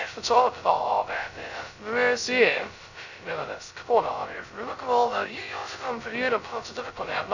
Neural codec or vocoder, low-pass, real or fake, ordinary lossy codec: codec, 16 kHz, 0.2 kbps, FocalCodec; 7.2 kHz; fake; none